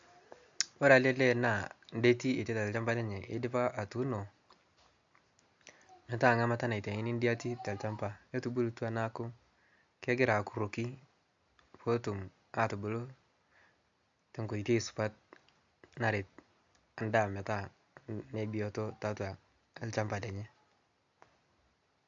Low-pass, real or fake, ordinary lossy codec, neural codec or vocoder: 7.2 kHz; real; AAC, 64 kbps; none